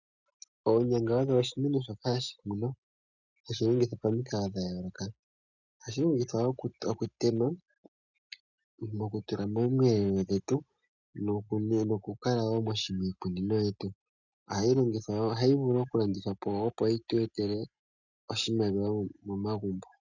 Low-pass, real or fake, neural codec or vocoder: 7.2 kHz; real; none